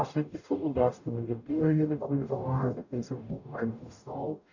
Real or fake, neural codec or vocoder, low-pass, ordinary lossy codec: fake; codec, 44.1 kHz, 0.9 kbps, DAC; 7.2 kHz; none